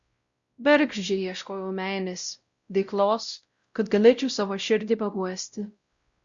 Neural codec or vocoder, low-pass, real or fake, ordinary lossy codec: codec, 16 kHz, 0.5 kbps, X-Codec, WavLM features, trained on Multilingual LibriSpeech; 7.2 kHz; fake; Opus, 64 kbps